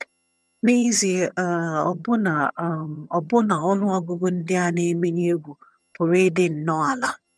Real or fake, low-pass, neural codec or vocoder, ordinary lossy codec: fake; none; vocoder, 22.05 kHz, 80 mel bands, HiFi-GAN; none